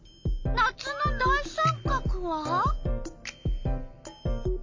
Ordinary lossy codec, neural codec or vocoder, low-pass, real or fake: MP3, 48 kbps; none; 7.2 kHz; real